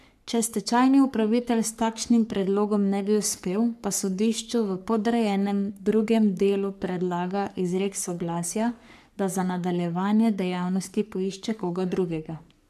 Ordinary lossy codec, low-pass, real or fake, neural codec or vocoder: none; 14.4 kHz; fake; codec, 44.1 kHz, 3.4 kbps, Pupu-Codec